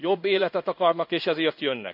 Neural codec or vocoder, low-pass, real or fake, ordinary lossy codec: codec, 16 kHz in and 24 kHz out, 1 kbps, XY-Tokenizer; 5.4 kHz; fake; none